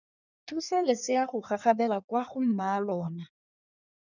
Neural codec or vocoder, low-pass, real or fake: codec, 16 kHz in and 24 kHz out, 1.1 kbps, FireRedTTS-2 codec; 7.2 kHz; fake